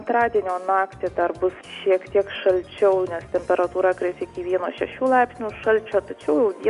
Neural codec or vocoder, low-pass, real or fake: none; 10.8 kHz; real